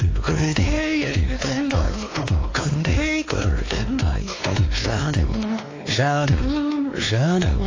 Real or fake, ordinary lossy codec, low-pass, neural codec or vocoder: fake; AAC, 32 kbps; 7.2 kHz; codec, 16 kHz, 2 kbps, X-Codec, WavLM features, trained on Multilingual LibriSpeech